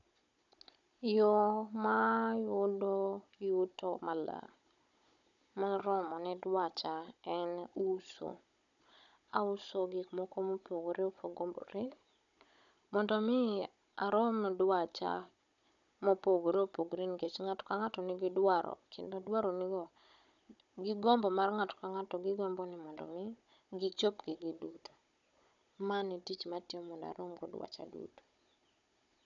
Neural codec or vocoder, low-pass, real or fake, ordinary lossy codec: codec, 16 kHz, 16 kbps, FunCodec, trained on Chinese and English, 50 frames a second; 7.2 kHz; fake; none